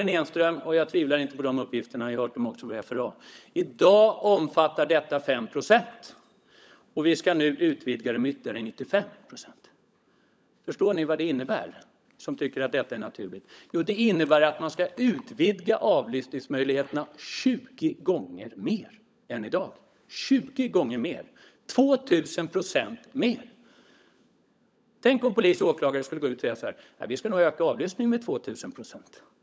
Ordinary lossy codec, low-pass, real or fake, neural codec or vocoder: none; none; fake; codec, 16 kHz, 16 kbps, FunCodec, trained on LibriTTS, 50 frames a second